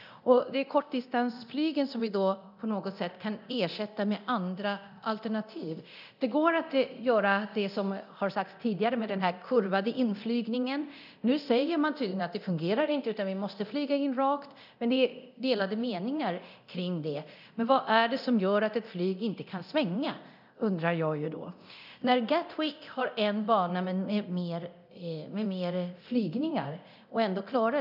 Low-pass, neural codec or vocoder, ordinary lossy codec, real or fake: 5.4 kHz; codec, 24 kHz, 0.9 kbps, DualCodec; none; fake